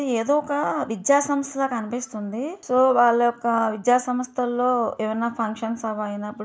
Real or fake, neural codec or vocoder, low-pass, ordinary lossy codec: real; none; none; none